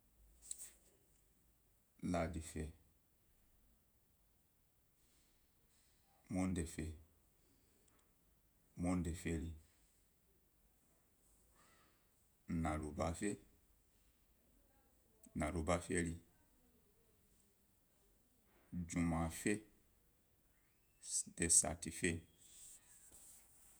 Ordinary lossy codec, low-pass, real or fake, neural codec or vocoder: none; none; fake; vocoder, 48 kHz, 128 mel bands, Vocos